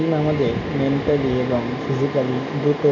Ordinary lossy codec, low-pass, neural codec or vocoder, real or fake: none; 7.2 kHz; none; real